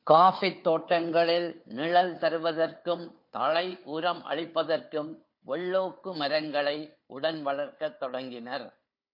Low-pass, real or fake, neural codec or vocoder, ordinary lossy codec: 5.4 kHz; fake; codec, 16 kHz, 4 kbps, FunCodec, trained on Chinese and English, 50 frames a second; MP3, 32 kbps